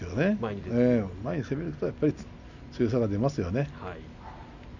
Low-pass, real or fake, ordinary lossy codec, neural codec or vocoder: 7.2 kHz; real; none; none